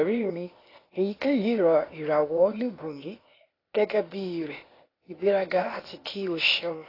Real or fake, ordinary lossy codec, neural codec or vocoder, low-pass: fake; AAC, 24 kbps; codec, 16 kHz, 0.8 kbps, ZipCodec; 5.4 kHz